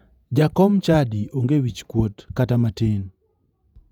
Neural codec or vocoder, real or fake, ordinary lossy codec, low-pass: vocoder, 48 kHz, 128 mel bands, Vocos; fake; none; 19.8 kHz